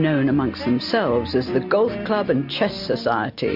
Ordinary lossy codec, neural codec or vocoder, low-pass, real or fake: MP3, 48 kbps; none; 5.4 kHz; real